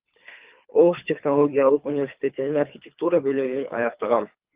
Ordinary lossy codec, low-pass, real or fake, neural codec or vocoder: Opus, 24 kbps; 3.6 kHz; fake; codec, 24 kHz, 3 kbps, HILCodec